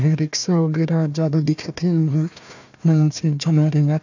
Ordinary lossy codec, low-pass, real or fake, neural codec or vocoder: none; 7.2 kHz; fake; codec, 16 kHz, 1 kbps, FunCodec, trained on Chinese and English, 50 frames a second